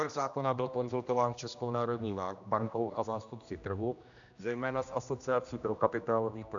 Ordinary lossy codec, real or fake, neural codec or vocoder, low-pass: MP3, 96 kbps; fake; codec, 16 kHz, 1 kbps, X-Codec, HuBERT features, trained on general audio; 7.2 kHz